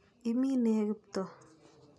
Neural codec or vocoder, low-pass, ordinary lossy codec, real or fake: none; none; none; real